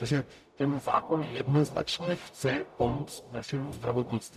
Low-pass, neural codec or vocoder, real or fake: 14.4 kHz; codec, 44.1 kHz, 0.9 kbps, DAC; fake